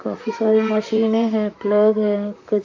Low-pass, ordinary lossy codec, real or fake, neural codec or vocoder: 7.2 kHz; AAC, 48 kbps; fake; vocoder, 44.1 kHz, 128 mel bands, Pupu-Vocoder